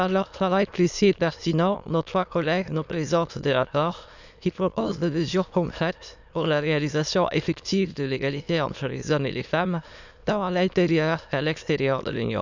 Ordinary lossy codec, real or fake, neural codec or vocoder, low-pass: none; fake; autoencoder, 22.05 kHz, a latent of 192 numbers a frame, VITS, trained on many speakers; 7.2 kHz